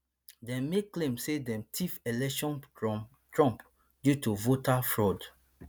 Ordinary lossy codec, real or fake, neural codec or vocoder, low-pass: none; real; none; none